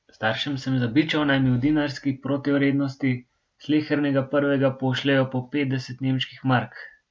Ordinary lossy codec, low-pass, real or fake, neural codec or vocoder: none; none; real; none